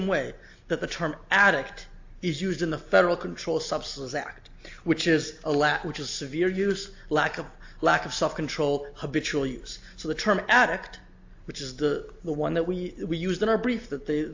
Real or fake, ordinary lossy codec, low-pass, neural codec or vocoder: real; AAC, 48 kbps; 7.2 kHz; none